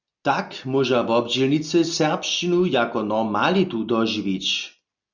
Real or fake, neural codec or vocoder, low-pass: real; none; 7.2 kHz